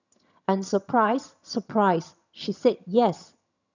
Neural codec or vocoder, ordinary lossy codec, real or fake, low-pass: vocoder, 22.05 kHz, 80 mel bands, HiFi-GAN; none; fake; 7.2 kHz